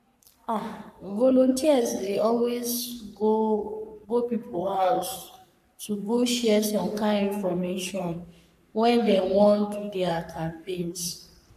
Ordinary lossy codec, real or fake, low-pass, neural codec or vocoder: none; fake; 14.4 kHz; codec, 44.1 kHz, 3.4 kbps, Pupu-Codec